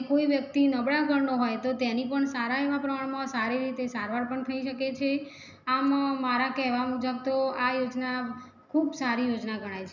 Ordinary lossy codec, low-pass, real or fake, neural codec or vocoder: none; 7.2 kHz; real; none